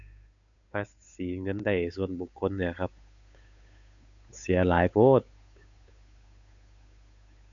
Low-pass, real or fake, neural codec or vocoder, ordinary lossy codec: 7.2 kHz; fake; codec, 16 kHz, 8 kbps, FunCodec, trained on Chinese and English, 25 frames a second; none